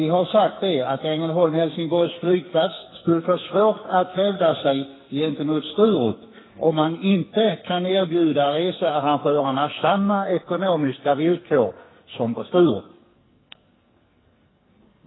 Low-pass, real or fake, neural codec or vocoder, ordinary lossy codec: 7.2 kHz; fake; codec, 44.1 kHz, 2.6 kbps, SNAC; AAC, 16 kbps